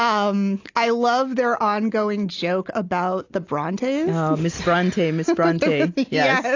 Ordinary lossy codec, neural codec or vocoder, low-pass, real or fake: AAC, 48 kbps; none; 7.2 kHz; real